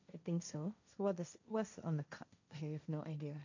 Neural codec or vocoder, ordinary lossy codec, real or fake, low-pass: codec, 16 kHz, 1.1 kbps, Voila-Tokenizer; none; fake; 7.2 kHz